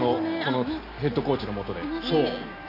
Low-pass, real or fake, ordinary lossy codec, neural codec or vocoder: 5.4 kHz; real; none; none